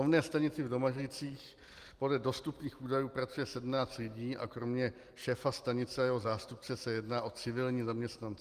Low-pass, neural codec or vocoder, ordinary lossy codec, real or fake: 14.4 kHz; none; Opus, 24 kbps; real